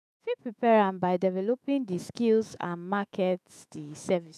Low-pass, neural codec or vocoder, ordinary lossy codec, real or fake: 14.4 kHz; autoencoder, 48 kHz, 128 numbers a frame, DAC-VAE, trained on Japanese speech; none; fake